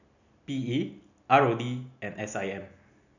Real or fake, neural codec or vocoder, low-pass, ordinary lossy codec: real; none; 7.2 kHz; none